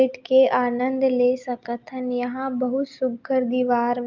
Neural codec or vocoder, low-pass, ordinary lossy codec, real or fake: none; 7.2 kHz; Opus, 24 kbps; real